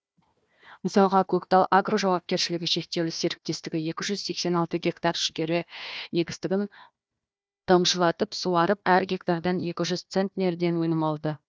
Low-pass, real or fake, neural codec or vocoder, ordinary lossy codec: none; fake; codec, 16 kHz, 1 kbps, FunCodec, trained on Chinese and English, 50 frames a second; none